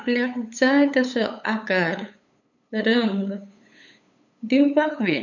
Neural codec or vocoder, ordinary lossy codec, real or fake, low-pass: codec, 16 kHz, 8 kbps, FunCodec, trained on LibriTTS, 25 frames a second; none; fake; 7.2 kHz